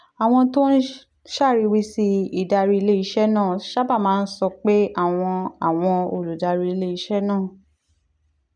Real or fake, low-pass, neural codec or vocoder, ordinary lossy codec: real; 9.9 kHz; none; none